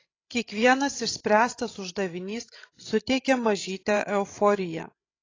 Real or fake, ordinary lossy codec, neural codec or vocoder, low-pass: fake; AAC, 32 kbps; codec, 16 kHz, 16 kbps, FreqCodec, larger model; 7.2 kHz